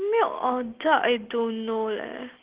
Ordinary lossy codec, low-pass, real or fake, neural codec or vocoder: Opus, 16 kbps; 3.6 kHz; real; none